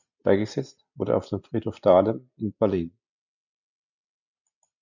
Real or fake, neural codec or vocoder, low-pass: real; none; 7.2 kHz